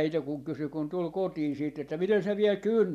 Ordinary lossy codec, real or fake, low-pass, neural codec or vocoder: none; real; 14.4 kHz; none